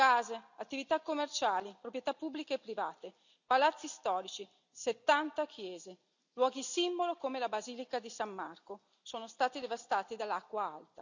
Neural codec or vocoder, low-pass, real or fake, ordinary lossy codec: none; 7.2 kHz; real; none